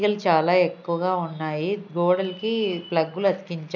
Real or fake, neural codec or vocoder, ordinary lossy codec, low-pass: real; none; none; 7.2 kHz